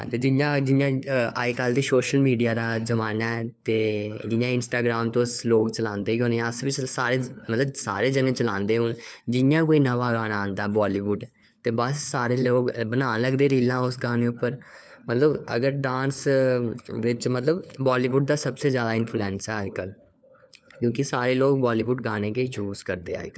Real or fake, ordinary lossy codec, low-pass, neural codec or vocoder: fake; none; none; codec, 16 kHz, 4 kbps, FunCodec, trained on LibriTTS, 50 frames a second